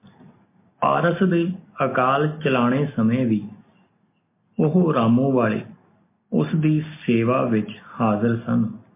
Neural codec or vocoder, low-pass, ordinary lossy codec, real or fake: none; 3.6 kHz; MP3, 24 kbps; real